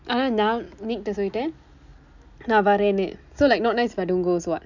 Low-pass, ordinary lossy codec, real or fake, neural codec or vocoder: 7.2 kHz; none; real; none